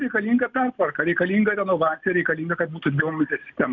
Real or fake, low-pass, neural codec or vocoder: real; 7.2 kHz; none